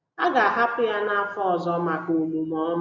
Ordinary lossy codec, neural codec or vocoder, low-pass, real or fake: none; none; 7.2 kHz; real